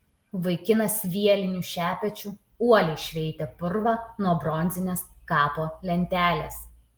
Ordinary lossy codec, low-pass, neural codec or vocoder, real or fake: Opus, 24 kbps; 19.8 kHz; none; real